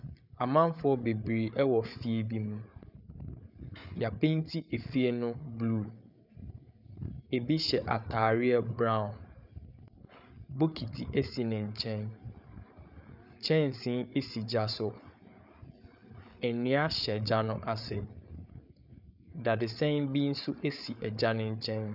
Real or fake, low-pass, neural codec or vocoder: fake; 5.4 kHz; codec, 16 kHz, 8 kbps, FreqCodec, larger model